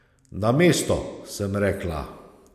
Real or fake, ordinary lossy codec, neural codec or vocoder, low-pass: real; none; none; 14.4 kHz